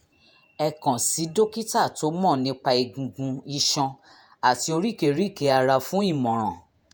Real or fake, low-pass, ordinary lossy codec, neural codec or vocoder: fake; none; none; vocoder, 48 kHz, 128 mel bands, Vocos